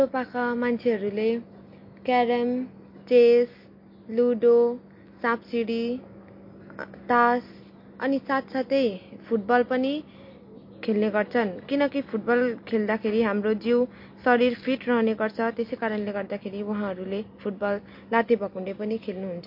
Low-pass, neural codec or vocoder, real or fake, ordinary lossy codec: 5.4 kHz; none; real; MP3, 32 kbps